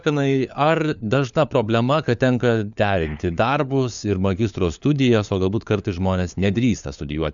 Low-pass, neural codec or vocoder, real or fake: 7.2 kHz; codec, 16 kHz, 4 kbps, FunCodec, trained on LibriTTS, 50 frames a second; fake